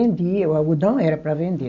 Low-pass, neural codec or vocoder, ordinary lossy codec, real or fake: 7.2 kHz; none; none; real